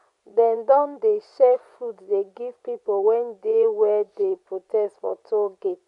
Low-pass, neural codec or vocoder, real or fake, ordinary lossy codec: 10.8 kHz; vocoder, 24 kHz, 100 mel bands, Vocos; fake; MP3, 64 kbps